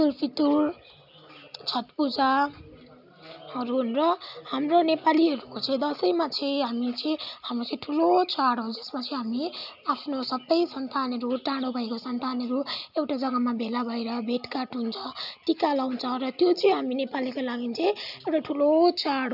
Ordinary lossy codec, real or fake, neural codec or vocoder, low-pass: none; real; none; 5.4 kHz